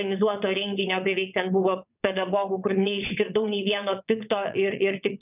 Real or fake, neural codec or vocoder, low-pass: fake; vocoder, 22.05 kHz, 80 mel bands, WaveNeXt; 3.6 kHz